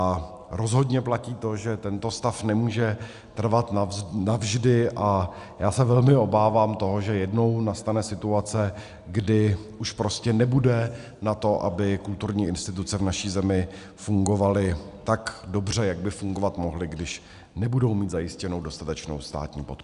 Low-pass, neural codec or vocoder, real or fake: 10.8 kHz; none; real